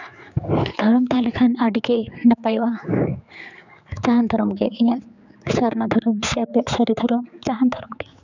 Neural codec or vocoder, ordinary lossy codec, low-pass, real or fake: codec, 16 kHz, 4 kbps, X-Codec, HuBERT features, trained on general audio; none; 7.2 kHz; fake